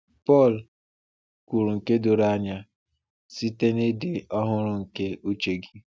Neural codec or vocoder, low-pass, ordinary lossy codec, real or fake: none; none; none; real